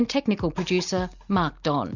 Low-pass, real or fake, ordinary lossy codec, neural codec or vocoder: 7.2 kHz; real; Opus, 64 kbps; none